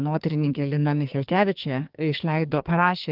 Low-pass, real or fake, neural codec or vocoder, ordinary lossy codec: 5.4 kHz; fake; codec, 44.1 kHz, 2.6 kbps, SNAC; Opus, 32 kbps